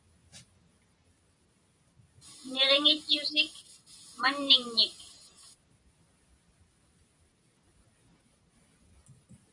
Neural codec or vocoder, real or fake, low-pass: none; real; 10.8 kHz